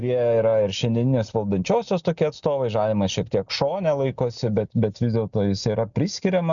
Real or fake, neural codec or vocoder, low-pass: real; none; 7.2 kHz